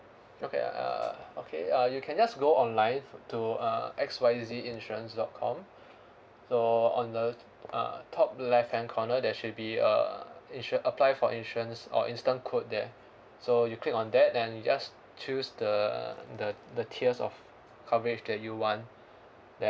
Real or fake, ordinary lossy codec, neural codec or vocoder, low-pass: real; none; none; none